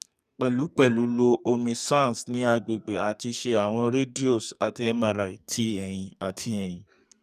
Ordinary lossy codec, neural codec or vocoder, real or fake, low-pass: none; codec, 44.1 kHz, 2.6 kbps, SNAC; fake; 14.4 kHz